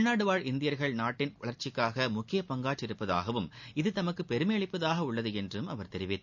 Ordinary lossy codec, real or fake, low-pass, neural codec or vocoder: none; real; 7.2 kHz; none